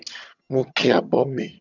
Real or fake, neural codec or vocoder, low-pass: fake; vocoder, 22.05 kHz, 80 mel bands, HiFi-GAN; 7.2 kHz